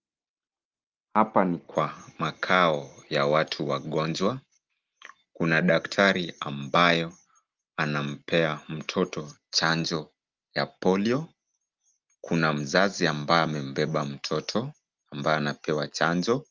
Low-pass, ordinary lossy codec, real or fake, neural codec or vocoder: 7.2 kHz; Opus, 32 kbps; real; none